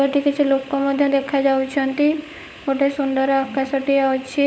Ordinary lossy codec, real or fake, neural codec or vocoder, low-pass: none; fake; codec, 16 kHz, 16 kbps, FunCodec, trained on LibriTTS, 50 frames a second; none